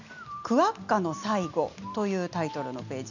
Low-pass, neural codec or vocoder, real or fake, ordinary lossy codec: 7.2 kHz; none; real; none